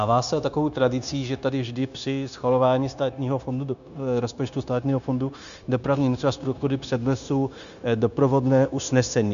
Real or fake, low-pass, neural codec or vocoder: fake; 7.2 kHz; codec, 16 kHz, 0.9 kbps, LongCat-Audio-Codec